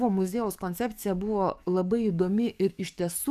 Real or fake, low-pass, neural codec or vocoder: fake; 14.4 kHz; codec, 44.1 kHz, 7.8 kbps, DAC